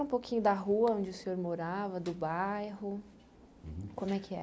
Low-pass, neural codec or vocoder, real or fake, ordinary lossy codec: none; none; real; none